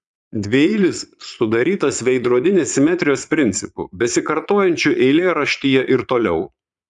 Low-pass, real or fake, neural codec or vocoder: 9.9 kHz; fake; vocoder, 22.05 kHz, 80 mel bands, Vocos